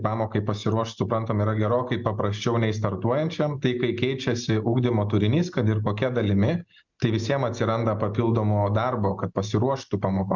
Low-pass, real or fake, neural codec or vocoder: 7.2 kHz; real; none